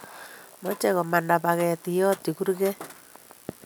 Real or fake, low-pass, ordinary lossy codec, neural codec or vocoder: real; none; none; none